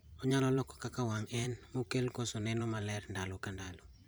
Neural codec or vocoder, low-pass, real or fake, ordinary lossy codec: vocoder, 44.1 kHz, 128 mel bands every 512 samples, BigVGAN v2; none; fake; none